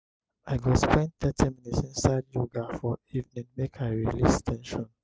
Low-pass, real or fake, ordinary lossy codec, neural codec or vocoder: none; real; none; none